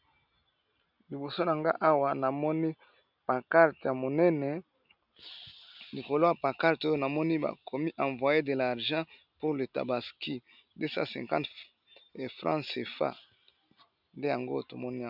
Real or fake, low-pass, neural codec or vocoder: real; 5.4 kHz; none